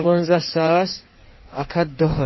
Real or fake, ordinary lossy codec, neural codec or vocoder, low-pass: fake; MP3, 24 kbps; codec, 16 kHz in and 24 kHz out, 1.1 kbps, FireRedTTS-2 codec; 7.2 kHz